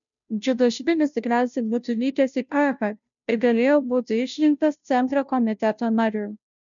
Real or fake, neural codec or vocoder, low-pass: fake; codec, 16 kHz, 0.5 kbps, FunCodec, trained on Chinese and English, 25 frames a second; 7.2 kHz